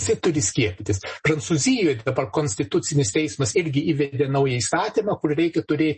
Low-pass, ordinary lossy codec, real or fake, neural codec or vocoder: 10.8 kHz; MP3, 32 kbps; real; none